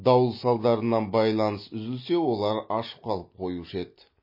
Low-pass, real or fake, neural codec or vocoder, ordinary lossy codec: 5.4 kHz; real; none; MP3, 24 kbps